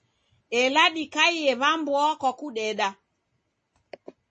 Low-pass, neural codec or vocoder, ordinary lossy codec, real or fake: 9.9 kHz; none; MP3, 32 kbps; real